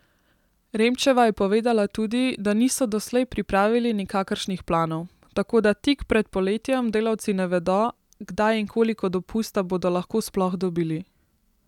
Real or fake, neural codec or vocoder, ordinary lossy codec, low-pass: real; none; none; 19.8 kHz